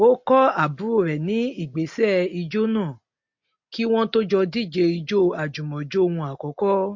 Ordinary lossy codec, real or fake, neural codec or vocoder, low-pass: MP3, 48 kbps; real; none; 7.2 kHz